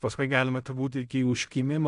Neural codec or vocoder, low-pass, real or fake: codec, 16 kHz in and 24 kHz out, 0.4 kbps, LongCat-Audio-Codec, fine tuned four codebook decoder; 10.8 kHz; fake